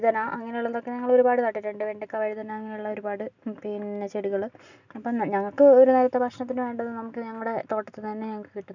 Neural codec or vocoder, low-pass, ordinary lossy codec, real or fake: none; 7.2 kHz; none; real